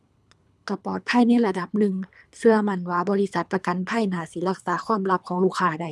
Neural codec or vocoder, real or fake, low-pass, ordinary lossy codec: codec, 24 kHz, 3 kbps, HILCodec; fake; none; none